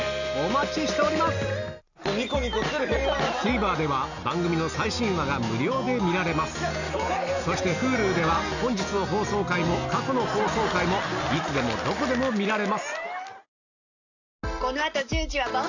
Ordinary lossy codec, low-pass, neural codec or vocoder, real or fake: none; 7.2 kHz; none; real